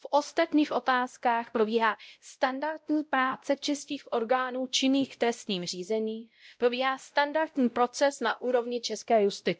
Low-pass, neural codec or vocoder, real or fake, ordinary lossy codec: none; codec, 16 kHz, 0.5 kbps, X-Codec, WavLM features, trained on Multilingual LibriSpeech; fake; none